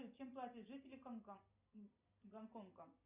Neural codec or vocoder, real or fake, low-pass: none; real; 3.6 kHz